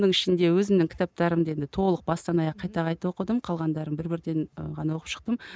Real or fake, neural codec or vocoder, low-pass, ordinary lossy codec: real; none; none; none